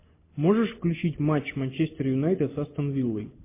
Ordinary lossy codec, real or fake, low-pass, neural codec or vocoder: MP3, 24 kbps; real; 3.6 kHz; none